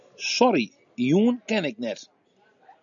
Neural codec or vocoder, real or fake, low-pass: none; real; 7.2 kHz